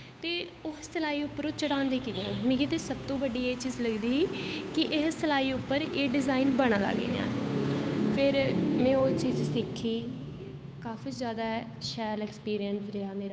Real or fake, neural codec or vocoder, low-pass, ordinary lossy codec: fake; codec, 16 kHz, 8 kbps, FunCodec, trained on Chinese and English, 25 frames a second; none; none